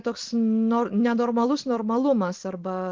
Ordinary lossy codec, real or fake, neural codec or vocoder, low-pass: Opus, 16 kbps; real; none; 7.2 kHz